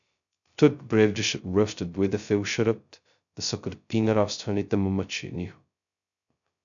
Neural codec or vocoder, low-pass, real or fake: codec, 16 kHz, 0.2 kbps, FocalCodec; 7.2 kHz; fake